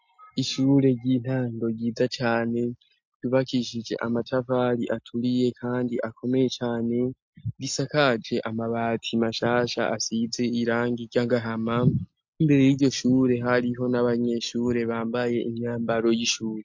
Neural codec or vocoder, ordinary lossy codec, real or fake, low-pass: none; MP3, 48 kbps; real; 7.2 kHz